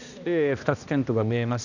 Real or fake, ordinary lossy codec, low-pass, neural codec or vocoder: fake; none; 7.2 kHz; codec, 16 kHz, 1 kbps, X-Codec, HuBERT features, trained on balanced general audio